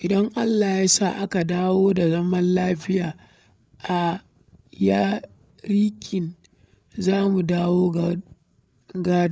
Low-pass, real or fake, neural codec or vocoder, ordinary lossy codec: none; fake; codec, 16 kHz, 8 kbps, FreqCodec, larger model; none